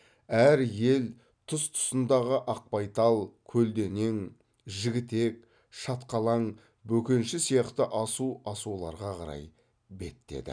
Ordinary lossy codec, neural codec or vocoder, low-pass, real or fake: none; none; 9.9 kHz; real